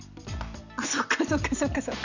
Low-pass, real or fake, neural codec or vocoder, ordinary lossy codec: 7.2 kHz; real; none; none